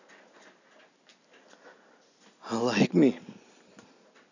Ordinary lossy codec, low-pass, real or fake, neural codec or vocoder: none; 7.2 kHz; real; none